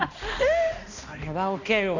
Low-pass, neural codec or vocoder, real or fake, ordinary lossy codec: 7.2 kHz; codec, 16 kHz, 1 kbps, X-Codec, HuBERT features, trained on balanced general audio; fake; none